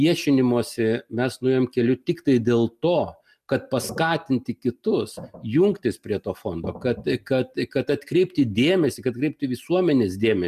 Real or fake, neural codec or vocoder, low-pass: real; none; 14.4 kHz